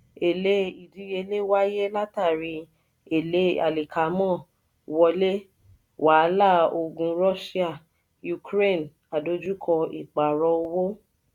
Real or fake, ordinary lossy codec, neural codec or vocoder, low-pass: real; none; none; 19.8 kHz